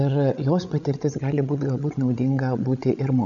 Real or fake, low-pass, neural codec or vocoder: fake; 7.2 kHz; codec, 16 kHz, 16 kbps, FreqCodec, larger model